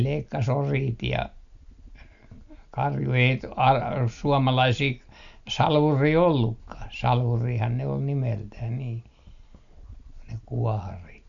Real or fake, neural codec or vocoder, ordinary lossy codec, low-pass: real; none; none; 7.2 kHz